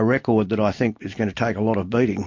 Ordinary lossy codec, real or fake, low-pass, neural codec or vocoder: MP3, 48 kbps; real; 7.2 kHz; none